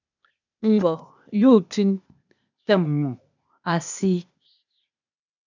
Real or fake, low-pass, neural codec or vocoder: fake; 7.2 kHz; codec, 16 kHz, 0.8 kbps, ZipCodec